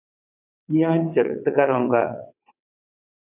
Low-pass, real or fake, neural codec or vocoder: 3.6 kHz; fake; vocoder, 22.05 kHz, 80 mel bands, WaveNeXt